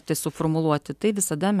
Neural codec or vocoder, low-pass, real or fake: none; 14.4 kHz; real